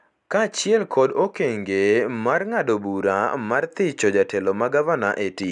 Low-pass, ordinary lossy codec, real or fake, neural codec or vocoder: 10.8 kHz; none; real; none